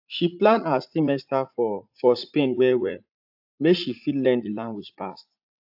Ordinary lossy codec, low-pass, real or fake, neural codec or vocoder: AAC, 48 kbps; 5.4 kHz; fake; vocoder, 44.1 kHz, 80 mel bands, Vocos